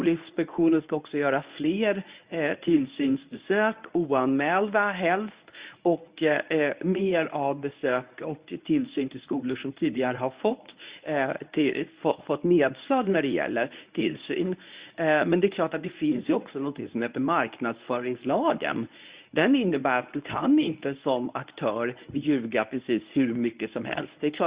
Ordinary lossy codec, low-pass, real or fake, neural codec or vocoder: Opus, 64 kbps; 3.6 kHz; fake; codec, 24 kHz, 0.9 kbps, WavTokenizer, medium speech release version 1